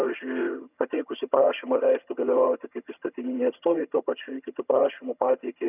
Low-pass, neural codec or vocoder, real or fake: 3.6 kHz; vocoder, 22.05 kHz, 80 mel bands, HiFi-GAN; fake